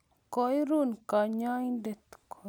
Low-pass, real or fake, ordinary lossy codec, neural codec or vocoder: none; real; none; none